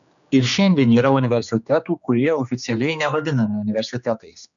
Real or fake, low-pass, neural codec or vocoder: fake; 7.2 kHz; codec, 16 kHz, 2 kbps, X-Codec, HuBERT features, trained on general audio